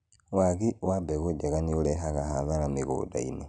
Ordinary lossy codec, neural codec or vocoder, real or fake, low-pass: none; none; real; 9.9 kHz